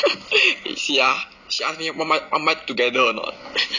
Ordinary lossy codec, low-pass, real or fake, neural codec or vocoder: none; 7.2 kHz; real; none